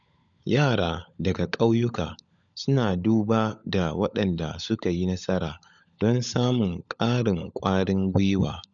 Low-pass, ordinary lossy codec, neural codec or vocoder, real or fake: 7.2 kHz; none; codec, 16 kHz, 16 kbps, FunCodec, trained on LibriTTS, 50 frames a second; fake